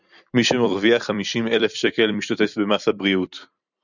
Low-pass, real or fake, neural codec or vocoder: 7.2 kHz; fake; vocoder, 44.1 kHz, 128 mel bands every 256 samples, BigVGAN v2